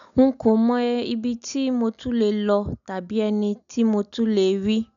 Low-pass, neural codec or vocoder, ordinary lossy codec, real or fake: 7.2 kHz; none; none; real